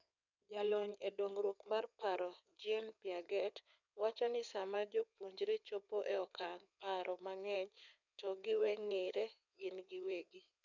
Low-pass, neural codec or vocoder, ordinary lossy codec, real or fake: 7.2 kHz; codec, 16 kHz in and 24 kHz out, 2.2 kbps, FireRedTTS-2 codec; none; fake